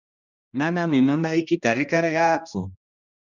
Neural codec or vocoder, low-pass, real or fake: codec, 16 kHz, 1 kbps, X-Codec, HuBERT features, trained on general audio; 7.2 kHz; fake